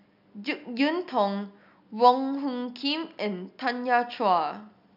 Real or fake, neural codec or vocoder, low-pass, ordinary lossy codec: real; none; 5.4 kHz; none